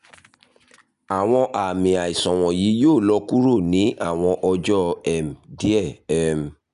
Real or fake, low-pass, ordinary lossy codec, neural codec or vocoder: real; 10.8 kHz; none; none